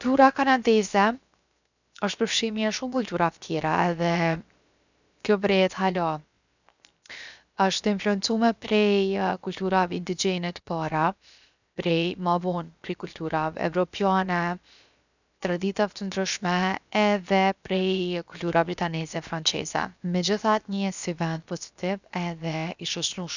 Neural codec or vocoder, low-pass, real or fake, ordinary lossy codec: codec, 16 kHz, 0.7 kbps, FocalCodec; 7.2 kHz; fake; none